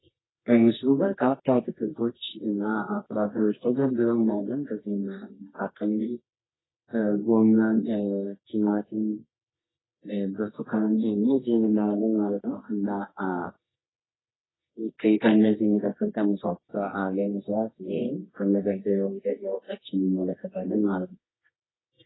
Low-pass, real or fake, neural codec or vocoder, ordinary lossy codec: 7.2 kHz; fake; codec, 24 kHz, 0.9 kbps, WavTokenizer, medium music audio release; AAC, 16 kbps